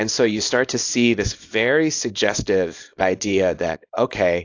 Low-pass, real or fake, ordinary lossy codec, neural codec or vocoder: 7.2 kHz; fake; AAC, 48 kbps; codec, 24 kHz, 0.9 kbps, WavTokenizer, small release